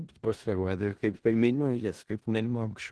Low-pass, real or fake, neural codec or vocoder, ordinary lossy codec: 10.8 kHz; fake; codec, 16 kHz in and 24 kHz out, 0.4 kbps, LongCat-Audio-Codec, four codebook decoder; Opus, 16 kbps